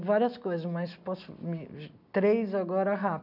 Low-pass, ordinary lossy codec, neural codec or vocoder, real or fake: 5.4 kHz; none; none; real